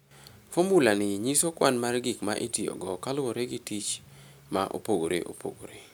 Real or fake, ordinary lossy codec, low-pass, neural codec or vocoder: real; none; none; none